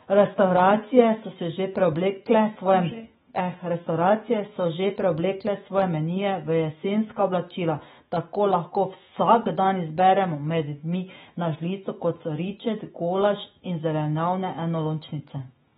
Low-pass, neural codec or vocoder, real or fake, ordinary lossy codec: 19.8 kHz; autoencoder, 48 kHz, 128 numbers a frame, DAC-VAE, trained on Japanese speech; fake; AAC, 16 kbps